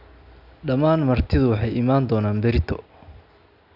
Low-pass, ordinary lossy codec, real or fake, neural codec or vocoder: 5.4 kHz; none; real; none